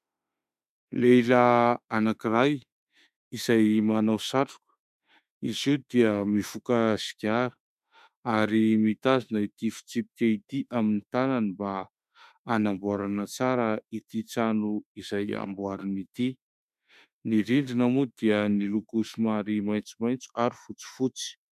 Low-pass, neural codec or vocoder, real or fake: 14.4 kHz; autoencoder, 48 kHz, 32 numbers a frame, DAC-VAE, trained on Japanese speech; fake